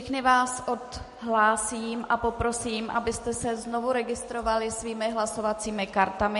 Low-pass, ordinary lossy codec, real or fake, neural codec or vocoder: 14.4 kHz; MP3, 48 kbps; real; none